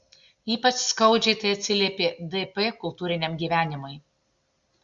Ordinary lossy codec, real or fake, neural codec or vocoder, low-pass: Opus, 64 kbps; real; none; 7.2 kHz